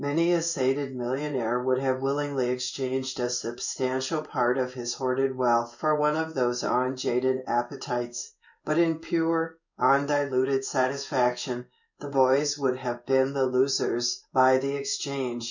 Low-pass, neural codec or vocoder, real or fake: 7.2 kHz; codec, 16 kHz in and 24 kHz out, 1 kbps, XY-Tokenizer; fake